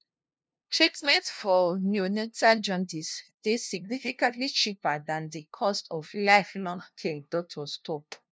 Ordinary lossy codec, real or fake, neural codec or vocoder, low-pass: none; fake; codec, 16 kHz, 0.5 kbps, FunCodec, trained on LibriTTS, 25 frames a second; none